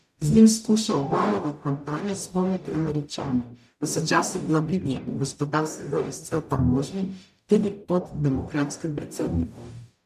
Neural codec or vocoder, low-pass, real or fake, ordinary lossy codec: codec, 44.1 kHz, 0.9 kbps, DAC; 14.4 kHz; fake; none